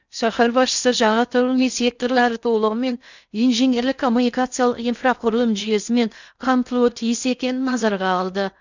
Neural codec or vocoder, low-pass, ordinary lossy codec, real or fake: codec, 16 kHz in and 24 kHz out, 0.6 kbps, FocalCodec, streaming, 2048 codes; 7.2 kHz; none; fake